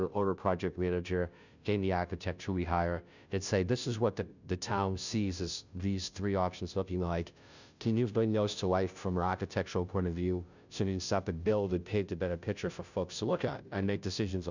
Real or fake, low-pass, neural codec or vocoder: fake; 7.2 kHz; codec, 16 kHz, 0.5 kbps, FunCodec, trained on Chinese and English, 25 frames a second